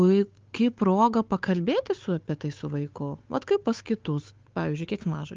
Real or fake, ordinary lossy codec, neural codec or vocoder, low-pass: fake; Opus, 32 kbps; codec, 16 kHz, 8 kbps, FunCodec, trained on Chinese and English, 25 frames a second; 7.2 kHz